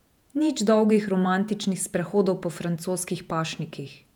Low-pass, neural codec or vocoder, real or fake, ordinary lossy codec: 19.8 kHz; vocoder, 48 kHz, 128 mel bands, Vocos; fake; none